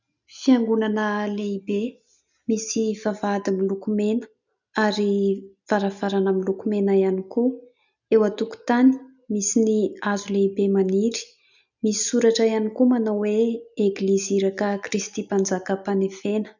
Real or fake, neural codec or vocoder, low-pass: real; none; 7.2 kHz